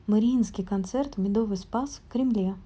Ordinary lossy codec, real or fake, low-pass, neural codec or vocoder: none; real; none; none